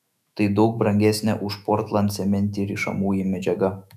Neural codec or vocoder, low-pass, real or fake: autoencoder, 48 kHz, 128 numbers a frame, DAC-VAE, trained on Japanese speech; 14.4 kHz; fake